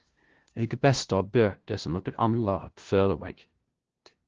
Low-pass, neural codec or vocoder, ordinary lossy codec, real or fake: 7.2 kHz; codec, 16 kHz, 0.5 kbps, FunCodec, trained on LibriTTS, 25 frames a second; Opus, 16 kbps; fake